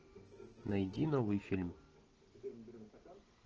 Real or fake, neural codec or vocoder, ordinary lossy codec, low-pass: real; none; Opus, 16 kbps; 7.2 kHz